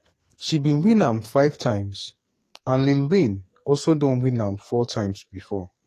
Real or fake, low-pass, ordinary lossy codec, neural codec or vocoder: fake; 14.4 kHz; AAC, 48 kbps; codec, 44.1 kHz, 2.6 kbps, SNAC